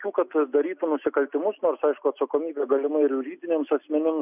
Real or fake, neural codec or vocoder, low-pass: real; none; 3.6 kHz